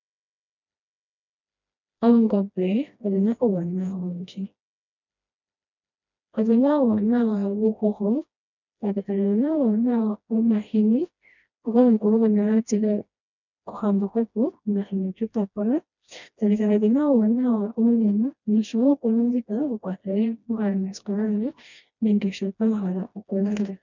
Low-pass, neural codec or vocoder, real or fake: 7.2 kHz; codec, 16 kHz, 1 kbps, FreqCodec, smaller model; fake